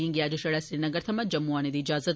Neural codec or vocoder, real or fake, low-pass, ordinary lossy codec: none; real; none; none